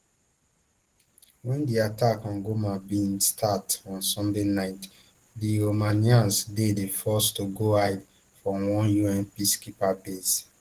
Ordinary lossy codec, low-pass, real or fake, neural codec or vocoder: Opus, 16 kbps; 14.4 kHz; real; none